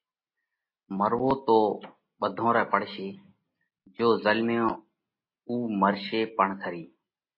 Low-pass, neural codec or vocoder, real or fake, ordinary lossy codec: 5.4 kHz; none; real; MP3, 24 kbps